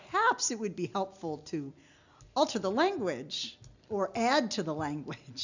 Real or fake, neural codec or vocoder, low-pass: real; none; 7.2 kHz